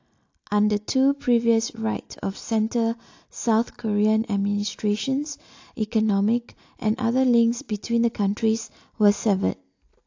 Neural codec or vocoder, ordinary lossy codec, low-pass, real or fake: none; AAC, 48 kbps; 7.2 kHz; real